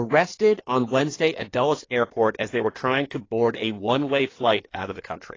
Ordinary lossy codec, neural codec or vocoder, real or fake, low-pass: AAC, 32 kbps; codec, 16 kHz in and 24 kHz out, 1.1 kbps, FireRedTTS-2 codec; fake; 7.2 kHz